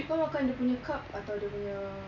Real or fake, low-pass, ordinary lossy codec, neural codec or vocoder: real; 7.2 kHz; MP3, 64 kbps; none